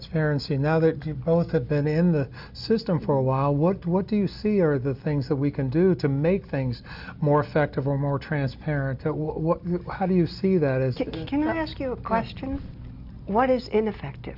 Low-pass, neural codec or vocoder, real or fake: 5.4 kHz; none; real